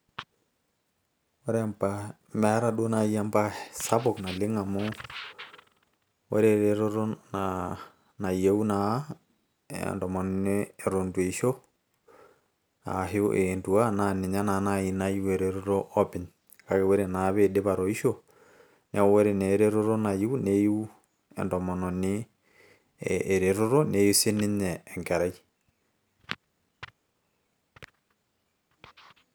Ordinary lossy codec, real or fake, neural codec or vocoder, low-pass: none; real; none; none